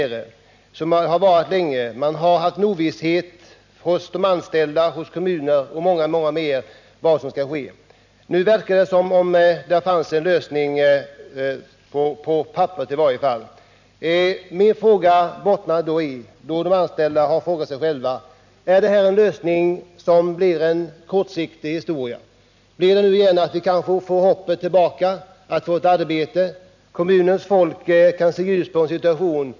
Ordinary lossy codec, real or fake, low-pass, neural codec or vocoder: none; real; 7.2 kHz; none